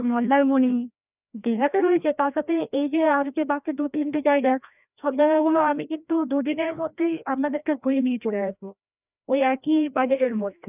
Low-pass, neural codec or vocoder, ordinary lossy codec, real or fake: 3.6 kHz; codec, 16 kHz, 1 kbps, FreqCodec, larger model; none; fake